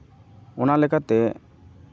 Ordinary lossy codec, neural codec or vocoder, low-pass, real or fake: none; none; none; real